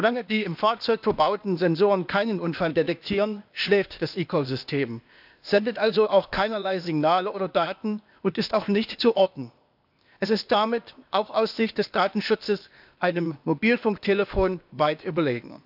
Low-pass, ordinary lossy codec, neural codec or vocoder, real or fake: 5.4 kHz; none; codec, 16 kHz, 0.8 kbps, ZipCodec; fake